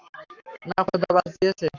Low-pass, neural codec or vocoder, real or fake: 7.2 kHz; codec, 44.1 kHz, 7.8 kbps, Pupu-Codec; fake